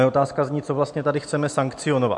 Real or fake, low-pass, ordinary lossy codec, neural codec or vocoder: real; 10.8 kHz; MP3, 64 kbps; none